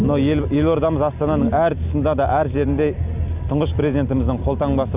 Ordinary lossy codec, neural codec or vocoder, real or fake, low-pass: Opus, 64 kbps; none; real; 3.6 kHz